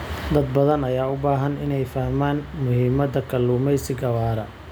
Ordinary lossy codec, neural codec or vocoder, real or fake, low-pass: none; none; real; none